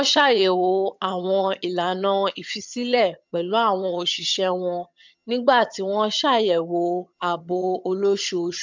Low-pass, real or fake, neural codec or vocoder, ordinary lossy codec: 7.2 kHz; fake; vocoder, 22.05 kHz, 80 mel bands, HiFi-GAN; MP3, 64 kbps